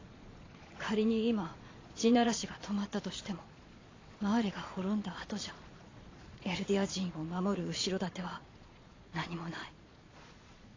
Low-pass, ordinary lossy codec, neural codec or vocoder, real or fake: 7.2 kHz; AAC, 32 kbps; vocoder, 22.05 kHz, 80 mel bands, Vocos; fake